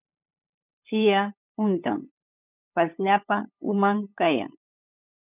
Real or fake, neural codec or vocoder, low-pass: fake; codec, 16 kHz, 8 kbps, FunCodec, trained on LibriTTS, 25 frames a second; 3.6 kHz